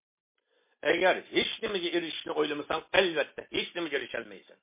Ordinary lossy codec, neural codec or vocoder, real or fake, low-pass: MP3, 24 kbps; none; real; 3.6 kHz